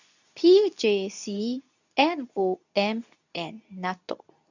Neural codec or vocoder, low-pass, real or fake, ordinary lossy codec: codec, 24 kHz, 0.9 kbps, WavTokenizer, medium speech release version 2; 7.2 kHz; fake; none